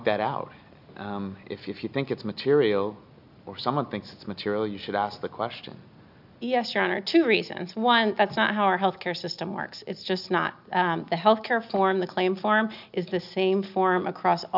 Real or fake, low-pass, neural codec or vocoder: real; 5.4 kHz; none